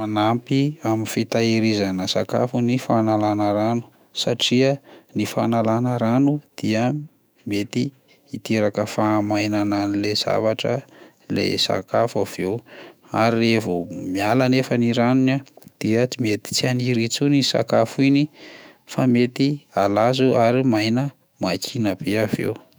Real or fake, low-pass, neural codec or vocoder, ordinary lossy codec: fake; none; autoencoder, 48 kHz, 128 numbers a frame, DAC-VAE, trained on Japanese speech; none